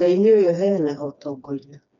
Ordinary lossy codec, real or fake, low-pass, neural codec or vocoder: none; fake; 7.2 kHz; codec, 16 kHz, 2 kbps, FreqCodec, smaller model